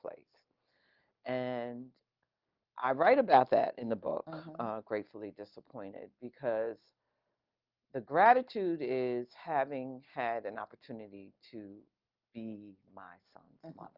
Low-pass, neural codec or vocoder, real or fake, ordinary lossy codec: 5.4 kHz; none; real; Opus, 32 kbps